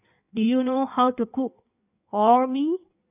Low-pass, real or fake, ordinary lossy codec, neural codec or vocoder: 3.6 kHz; fake; none; codec, 16 kHz in and 24 kHz out, 1.1 kbps, FireRedTTS-2 codec